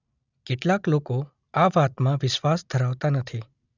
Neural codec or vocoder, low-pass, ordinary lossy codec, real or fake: none; 7.2 kHz; none; real